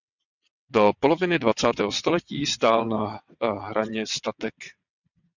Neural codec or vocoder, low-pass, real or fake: vocoder, 22.05 kHz, 80 mel bands, WaveNeXt; 7.2 kHz; fake